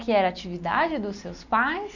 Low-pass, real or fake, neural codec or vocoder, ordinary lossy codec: 7.2 kHz; real; none; none